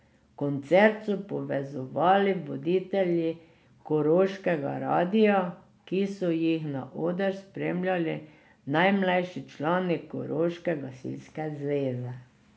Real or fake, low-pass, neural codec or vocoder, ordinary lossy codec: real; none; none; none